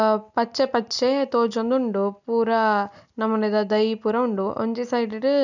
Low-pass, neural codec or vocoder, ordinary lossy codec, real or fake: 7.2 kHz; none; none; real